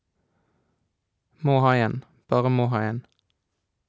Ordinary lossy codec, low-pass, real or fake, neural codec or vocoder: none; none; real; none